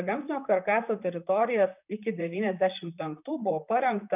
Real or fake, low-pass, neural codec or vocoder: fake; 3.6 kHz; vocoder, 44.1 kHz, 128 mel bands, Pupu-Vocoder